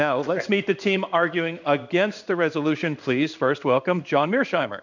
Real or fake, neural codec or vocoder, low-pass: fake; codec, 16 kHz in and 24 kHz out, 1 kbps, XY-Tokenizer; 7.2 kHz